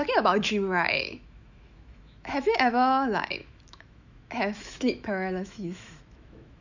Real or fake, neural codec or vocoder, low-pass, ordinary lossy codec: fake; autoencoder, 48 kHz, 128 numbers a frame, DAC-VAE, trained on Japanese speech; 7.2 kHz; none